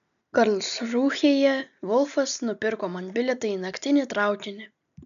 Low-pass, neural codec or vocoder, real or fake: 7.2 kHz; none; real